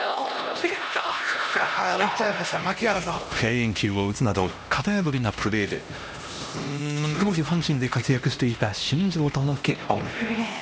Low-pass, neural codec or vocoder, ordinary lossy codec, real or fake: none; codec, 16 kHz, 1 kbps, X-Codec, HuBERT features, trained on LibriSpeech; none; fake